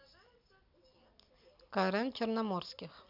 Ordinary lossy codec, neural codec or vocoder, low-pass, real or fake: none; none; 5.4 kHz; real